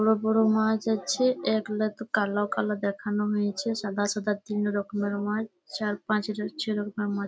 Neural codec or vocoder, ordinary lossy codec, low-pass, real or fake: none; none; none; real